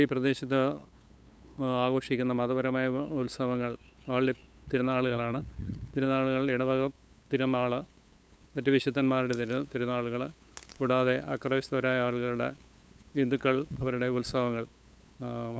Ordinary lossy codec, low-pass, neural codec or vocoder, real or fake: none; none; codec, 16 kHz, 8 kbps, FunCodec, trained on LibriTTS, 25 frames a second; fake